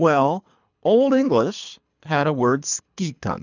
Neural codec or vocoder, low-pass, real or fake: codec, 24 kHz, 3 kbps, HILCodec; 7.2 kHz; fake